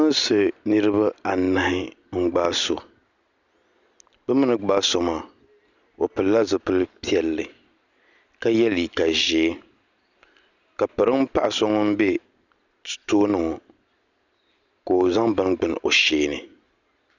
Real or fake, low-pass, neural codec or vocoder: real; 7.2 kHz; none